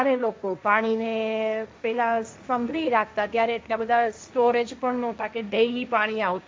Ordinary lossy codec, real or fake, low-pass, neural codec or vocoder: none; fake; none; codec, 16 kHz, 1.1 kbps, Voila-Tokenizer